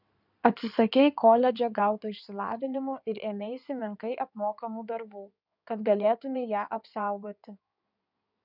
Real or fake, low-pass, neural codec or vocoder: fake; 5.4 kHz; codec, 16 kHz in and 24 kHz out, 2.2 kbps, FireRedTTS-2 codec